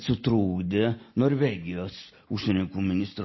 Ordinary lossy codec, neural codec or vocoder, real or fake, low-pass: MP3, 24 kbps; none; real; 7.2 kHz